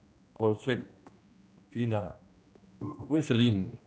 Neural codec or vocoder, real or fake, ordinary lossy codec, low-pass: codec, 16 kHz, 1 kbps, X-Codec, HuBERT features, trained on general audio; fake; none; none